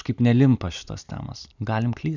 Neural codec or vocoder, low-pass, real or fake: none; 7.2 kHz; real